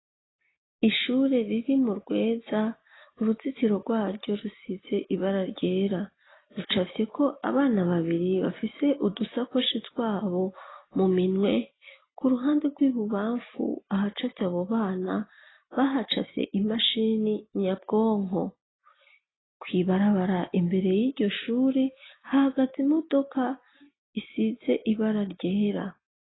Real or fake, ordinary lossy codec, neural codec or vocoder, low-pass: real; AAC, 16 kbps; none; 7.2 kHz